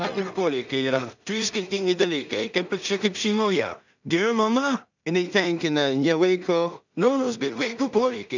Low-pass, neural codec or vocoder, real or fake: 7.2 kHz; codec, 16 kHz in and 24 kHz out, 0.4 kbps, LongCat-Audio-Codec, two codebook decoder; fake